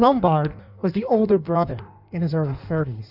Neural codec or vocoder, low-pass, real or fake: codec, 16 kHz in and 24 kHz out, 1.1 kbps, FireRedTTS-2 codec; 5.4 kHz; fake